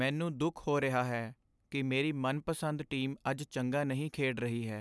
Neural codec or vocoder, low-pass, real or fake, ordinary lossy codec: none; none; real; none